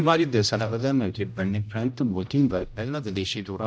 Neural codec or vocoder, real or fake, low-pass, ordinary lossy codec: codec, 16 kHz, 0.5 kbps, X-Codec, HuBERT features, trained on general audio; fake; none; none